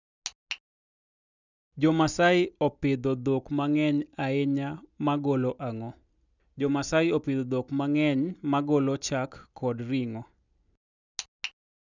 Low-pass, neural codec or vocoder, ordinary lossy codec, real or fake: 7.2 kHz; none; none; real